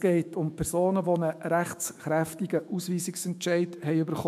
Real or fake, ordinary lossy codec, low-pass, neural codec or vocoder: fake; MP3, 96 kbps; 14.4 kHz; autoencoder, 48 kHz, 128 numbers a frame, DAC-VAE, trained on Japanese speech